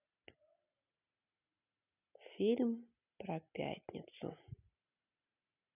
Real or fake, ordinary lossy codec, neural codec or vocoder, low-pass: real; none; none; 3.6 kHz